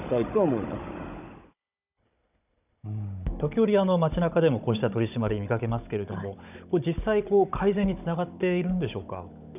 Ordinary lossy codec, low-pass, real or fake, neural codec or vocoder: none; 3.6 kHz; fake; codec, 16 kHz, 16 kbps, FunCodec, trained on Chinese and English, 50 frames a second